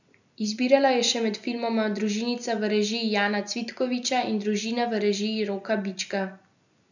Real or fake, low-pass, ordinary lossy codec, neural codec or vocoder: real; 7.2 kHz; none; none